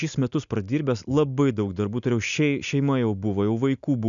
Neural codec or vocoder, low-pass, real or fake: none; 7.2 kHz; real